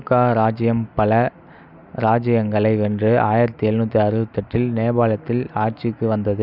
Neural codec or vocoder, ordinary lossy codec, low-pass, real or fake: none; none; 5.4 kHz; real